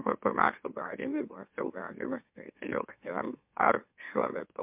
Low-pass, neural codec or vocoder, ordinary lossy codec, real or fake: 3.6 kHz; autoencoder, 44.1 kHz, a latent of 192 numbers a frame, MeloTTS; MP3, 32 kbps; fake